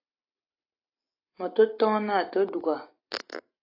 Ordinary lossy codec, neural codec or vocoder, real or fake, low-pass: AAC, 32 kbps; none; real; 5.4 kHz